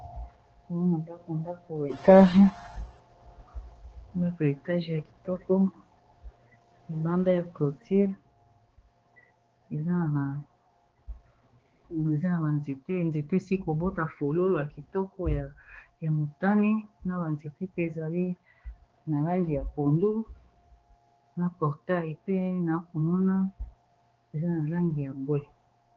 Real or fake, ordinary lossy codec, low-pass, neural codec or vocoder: fake; Opus, 16 kbps; 7.2 kHz; codec, 16 kHz, 2 kbps, X-Codec, HuBERT features, trained on balanced general audio